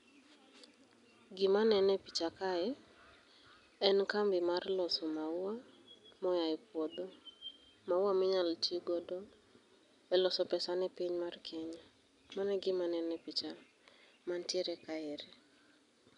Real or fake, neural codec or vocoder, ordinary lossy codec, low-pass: real; none; none; 10.8 kHz